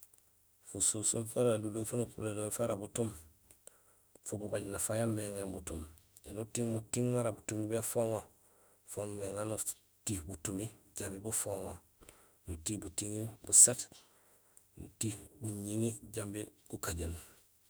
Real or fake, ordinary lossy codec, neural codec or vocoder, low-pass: fake; none; autoencoder, 48 kHz, 32 numbers a frame, DAC-VAE, trained on Japanese speech; none